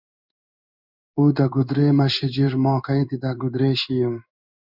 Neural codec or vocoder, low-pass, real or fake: codec, 16 kHz in and 24 kHz out, 1 kbps, XY-Tokenizer; 5.4 kHz; fake